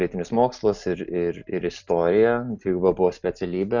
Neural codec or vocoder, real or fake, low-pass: none; real; 7.2 kHz